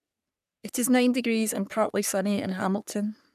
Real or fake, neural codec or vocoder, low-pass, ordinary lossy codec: fake; codec, 44.1 kHz, 3.4 kbps, Pupu-Codec; 14.4 kHz; none